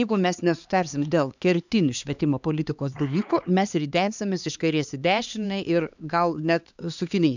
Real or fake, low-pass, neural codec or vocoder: fake; 7.2 kHz; codec, 16 kHz, 2 kbps, X-Codec, HuBERT features, trained on LibriSpeech